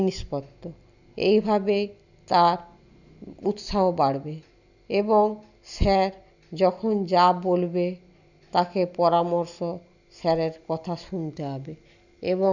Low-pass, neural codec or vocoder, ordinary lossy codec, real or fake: 7.2 kHz; none; none; real